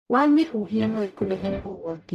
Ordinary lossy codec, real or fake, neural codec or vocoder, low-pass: none; fake; codec, 44.1 kHz, 0.9 kbps, DAC; 14.4 kHz